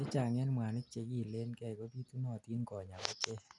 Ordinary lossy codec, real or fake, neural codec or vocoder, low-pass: none; real; none; 10.8 kHz